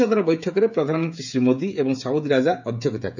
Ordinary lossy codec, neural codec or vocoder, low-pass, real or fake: none; codec, 16 kHz, 16 kbps, FreqCodec, smaller model; 7.2 kHz; fake